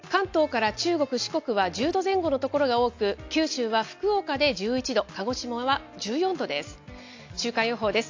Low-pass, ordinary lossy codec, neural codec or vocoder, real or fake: 7.2 kHz; AAC, 48 kbps; none; real